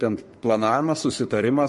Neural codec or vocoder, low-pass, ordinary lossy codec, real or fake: codec, 44.1 kHz, 3.4 kbps, Pupu-Codec; 14.4 kHz; MP3, 48 kbps; fake